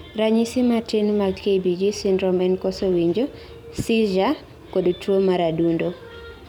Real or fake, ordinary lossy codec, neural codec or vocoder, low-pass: fake; none; vocoder, 44.1 kHz, 128 mel bands every 256 samples, BigVGAN v2; 19.8 kHz